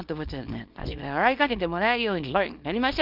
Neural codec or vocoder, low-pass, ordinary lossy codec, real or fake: codec, 24 kHz, 0.9 kbps, WavTokenizer, small release; 5.4 kHz; Opus, 32 kbps; fake